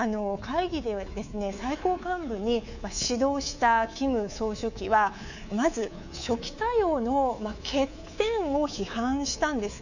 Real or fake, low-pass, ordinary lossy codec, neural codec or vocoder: fake; 7.2 kHz; none; codec, 24 kHz, 3.1 kbps, DualCodec